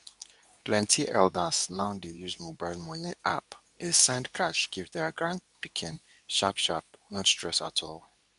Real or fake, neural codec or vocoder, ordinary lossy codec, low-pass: fake; codec, 24 kHz, 0.9 kbps, WavTokenizer, medium speech release version 2; none; 10.8 kHz